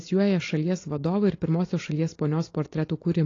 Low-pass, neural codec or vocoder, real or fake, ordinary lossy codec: 7.2 kHz; none; real; AAC, 32 kbps